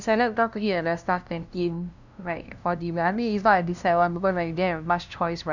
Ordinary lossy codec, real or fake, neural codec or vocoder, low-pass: none; fake; codec, 16 kHz, 0.5 kbps, FunCodec, trained on LibriTTS, 25 frames a second; 7.2 kHz